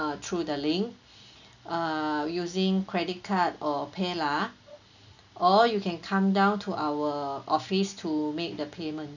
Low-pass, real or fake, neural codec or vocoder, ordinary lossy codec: 7.2 kHz; real; none; none